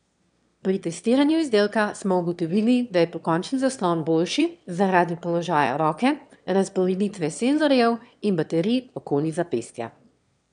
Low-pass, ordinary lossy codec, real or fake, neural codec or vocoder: 9.9 kHz; none; fake; autoencoder, 22.05 kHz, a latent of 192 numbers a frame, VITS, trained on one speaker